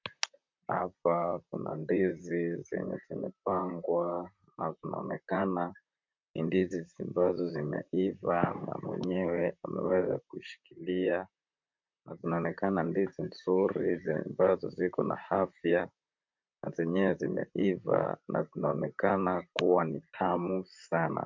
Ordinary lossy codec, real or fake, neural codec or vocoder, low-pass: MP3, 64 kbps; fake; vocoder, 44.1 kHz, 128 mel bands, Pupu-Vocoder; 7.2 kHz